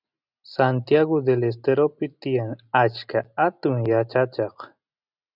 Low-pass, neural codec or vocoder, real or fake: 5.4 kHz; none; real